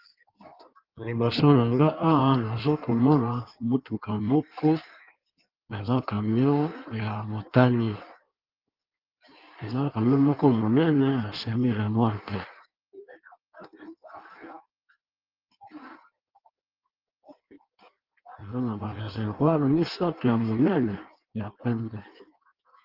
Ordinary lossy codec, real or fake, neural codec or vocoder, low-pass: Opus, 32 kbps; fake; codec, 16 kHz in and 24 kHz out, 1.1 kbps, FireRedTTS-2 codec; 5.4 kHz